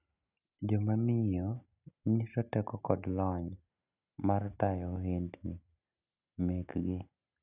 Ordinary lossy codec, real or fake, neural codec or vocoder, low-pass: none; real; none; 3.6 kHz